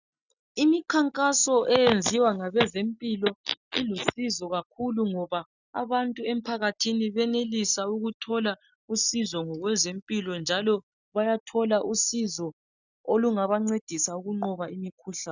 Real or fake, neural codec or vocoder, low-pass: real; none; 7.2 kHz